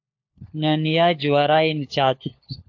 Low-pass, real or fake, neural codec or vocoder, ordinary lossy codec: 7.2 kHz; fake; codec, 16 kHz, 4 kbps, FunCodec, trained on LibriTTS, 50 frames a second; AAC, 48 kbps